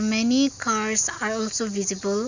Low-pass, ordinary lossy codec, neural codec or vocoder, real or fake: 7.2 kHz; Opus, 64 kbps; none; real